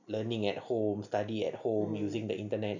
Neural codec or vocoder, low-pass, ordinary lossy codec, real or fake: none; 7.2 kHz; none; real